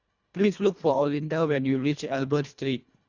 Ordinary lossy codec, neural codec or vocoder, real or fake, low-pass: Opus, 64 kbps; codec, 24 kHz, 1.5 kbps, HILCodec; fake; 7.2 kHz